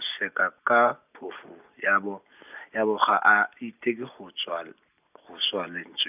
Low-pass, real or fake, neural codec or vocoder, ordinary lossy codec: 3.6 kHz; real; none; none